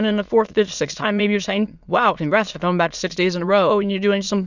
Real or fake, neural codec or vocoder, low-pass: fake; autoencoder, 22.05 kHz, a latent of 192 numbers a frame, VITS, trained on many speakers; 7.2 kHz